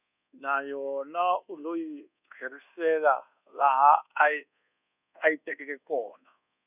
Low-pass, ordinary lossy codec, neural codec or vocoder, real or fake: 3.6 kHz; none; codec, 24 kHz, 1.2 kbps, DualCodec; fake